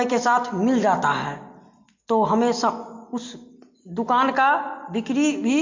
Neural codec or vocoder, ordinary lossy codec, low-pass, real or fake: none; MP3, 48 kbps; 7.2 kHz; real